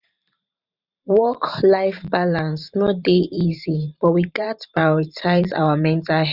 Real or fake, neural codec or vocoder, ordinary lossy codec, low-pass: fake; vocoder, 44.1 kHz, 128 mel bands every 512 samples, BigVGAN v2; none; 5.4 kHz